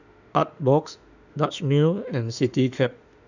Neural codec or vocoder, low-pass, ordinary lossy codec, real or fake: autoencoder, 48 kHz, 32 numbers a frame, DAC-VAE, trained on Japanese speech; 7.2 kHz; none; fake